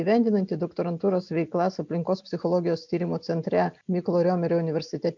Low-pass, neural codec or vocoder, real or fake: 7.2 kHz; none; real